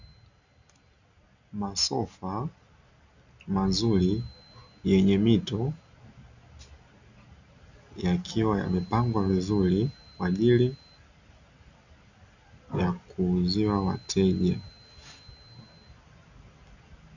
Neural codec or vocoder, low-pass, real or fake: none; 7.2 kHz; real